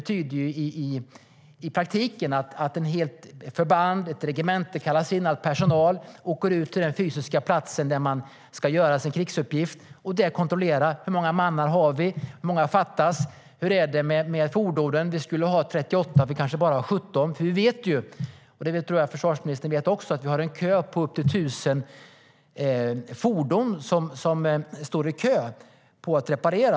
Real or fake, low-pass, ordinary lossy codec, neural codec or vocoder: real; none; none; none